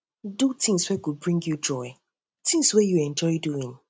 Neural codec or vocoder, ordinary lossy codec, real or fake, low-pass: none; none; real; none